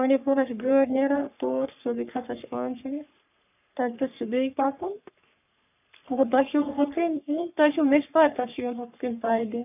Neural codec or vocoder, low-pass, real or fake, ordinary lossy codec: codec, 44.1 kHz, 3.4 kbps, Pupu-Codec; 3.6 kHz; fake; none